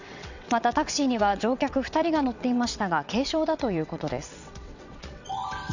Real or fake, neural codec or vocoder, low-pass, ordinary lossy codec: fake; vocoder, 22.05 kHz, 80 mel bands, WaveNeXt; 7.2 kHz; none